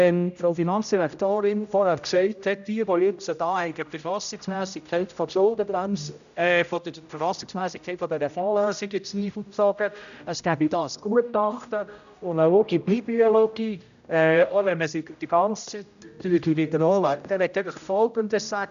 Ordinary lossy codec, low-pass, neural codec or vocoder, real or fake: none; 7.2 kHz; codec, 16 kHz, 0.5 kbps, X-Codec, HuBERT features, trained on general audio; fake